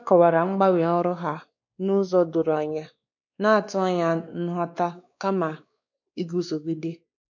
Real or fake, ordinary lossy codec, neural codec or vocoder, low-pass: fake; none; codec, 16 kHz, 2 kbps, X-Codec, WavLM features, trained on Multilingual LibriSpeech; 7.2 kHz